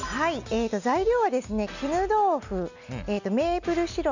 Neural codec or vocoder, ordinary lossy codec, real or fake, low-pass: none; none; real; 7.2 kHz